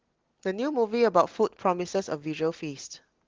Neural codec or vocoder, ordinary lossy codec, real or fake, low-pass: none; Opus, 16 kbps; real; 7.2 kHz